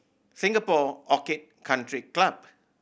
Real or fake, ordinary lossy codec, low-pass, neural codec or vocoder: real; none; none; none